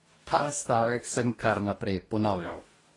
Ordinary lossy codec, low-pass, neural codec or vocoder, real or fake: AAC, 32 kbps; 10.8 kHz; codec, 44.1 kHz, 2.6 kbps, DAC; fake